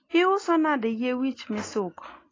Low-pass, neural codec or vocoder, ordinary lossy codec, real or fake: 7.2 kHz; none; AAC, 32 kbps; real